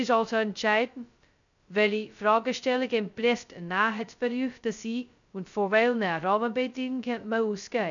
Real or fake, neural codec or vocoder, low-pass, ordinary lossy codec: fake; codec, 16 kHz, 0.2 kbps, FocalCodec; 7.2 kHz; none